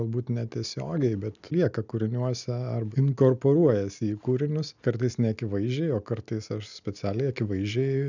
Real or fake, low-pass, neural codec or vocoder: real; 7.2 kHz; none